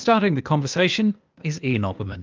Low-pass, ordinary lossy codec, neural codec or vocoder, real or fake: 7.2 kHz; Opus, 32 kbps; codec, 16 kHz, 0.8 kbps, ZipCodec; fake